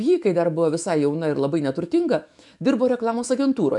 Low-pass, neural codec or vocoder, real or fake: 10.8 kHz; autoencoder, 48 kHz, 128 numbers a frame, DAC-VAE, trained on Japanese speech; fake